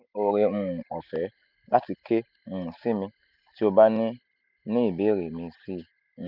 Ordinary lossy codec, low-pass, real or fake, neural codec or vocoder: none; 5.4 kHz; real; none